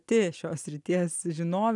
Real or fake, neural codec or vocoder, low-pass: real; none; 10.8 kHz